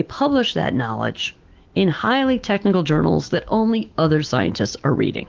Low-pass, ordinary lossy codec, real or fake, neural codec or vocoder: 7.2 kHz; Opus, 16 kbps; fake; autoencoder, 48 kHz, 128 numbers a frame, DAC-VAE, trained on Japanese speech